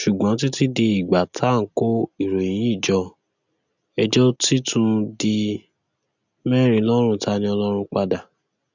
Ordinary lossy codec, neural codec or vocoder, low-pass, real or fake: none; none; 7.2 kHz; real